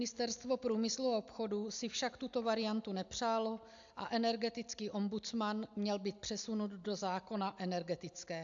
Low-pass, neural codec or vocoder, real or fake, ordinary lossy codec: 7.2 kHz; none; real; AAC, 64 kbps